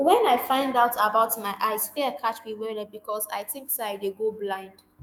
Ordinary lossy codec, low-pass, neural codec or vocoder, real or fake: none; none; autoencoder, 48 kHz, 128 numbers a frame, DAC-VAE, trained on Japanese speech; fake